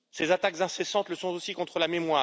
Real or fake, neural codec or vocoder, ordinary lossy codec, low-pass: real; none; none; none